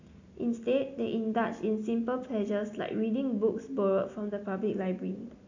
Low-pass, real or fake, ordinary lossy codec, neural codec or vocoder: 7.2 kHz; real; AAC, 48 kbps; none